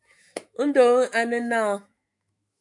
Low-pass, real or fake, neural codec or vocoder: 10.8 kHz; fake; autoencoder, 48 kHz, 128 numbers a frame, DAC-VAE, trained on Japanese speech